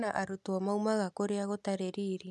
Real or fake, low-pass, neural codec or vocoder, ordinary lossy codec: real; none; none; none